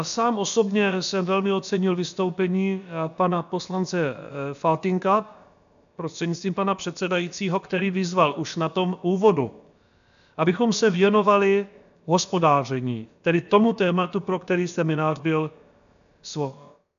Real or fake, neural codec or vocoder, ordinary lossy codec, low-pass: fake; codec, 16 kHz, about 1 kbps, DyCAST, with the encoder's durations; MP3, 96 kbps; 7.2 kHz